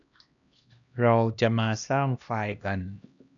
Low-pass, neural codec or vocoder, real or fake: 7.2 kHz; codec, 16 kHz, 1 kbps, X-Codec, HuBERT features, trained on LibriSpeech; fake